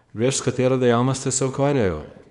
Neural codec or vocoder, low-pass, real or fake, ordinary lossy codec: codec, 24 kHz, 0.9 kbps, WavTokenizer, small release; 10.8 kHz; fake; none